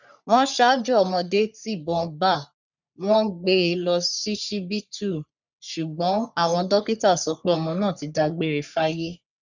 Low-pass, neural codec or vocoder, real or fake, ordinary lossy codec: 7.2 kHz; codec, 44.1 kHz, 3.4 kbps, Pupu-Codec; fake; none